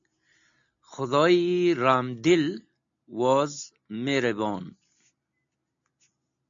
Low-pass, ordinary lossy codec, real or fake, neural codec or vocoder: 7.2 kHz; AAC, 64 kbps; real; none